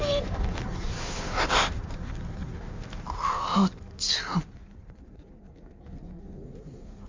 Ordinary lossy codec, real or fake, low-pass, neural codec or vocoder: MP3, 64 kbps; fake; 7.2 kHz; codec, 16 kHz in and 24 kHz out, 1.1 kbps, FireRedTTS-2 codec